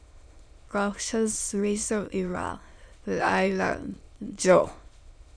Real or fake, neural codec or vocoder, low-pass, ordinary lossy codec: fake; autoencoder, 22.05 kHz, a latent of 192 numbers a frame, VITS, trained on many speakers; 9.9 kHz; none